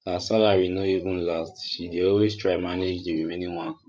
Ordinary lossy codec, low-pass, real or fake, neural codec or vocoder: none; none; fake; codec, 16 kHz, 16 kbps, FreqCodec, larger model